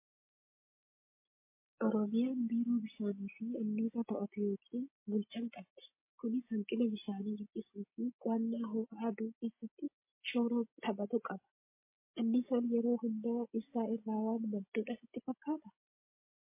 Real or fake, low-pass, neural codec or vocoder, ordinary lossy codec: real; 3.6 kHz; none; MP3, 24 kbps